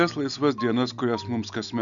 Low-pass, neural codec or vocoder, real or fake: 7.2 kHz; none; real